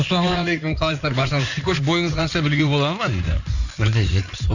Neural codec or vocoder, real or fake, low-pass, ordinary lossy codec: codec, 16 kHz in and 24 kHz out, 2.2 kbps, FireRedTTS-2 codec; fake; 7.2 kHz; none